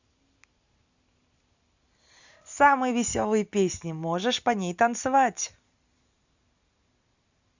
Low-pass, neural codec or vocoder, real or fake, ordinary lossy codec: 7.2 kHz; none; real; Opus, 64 kbps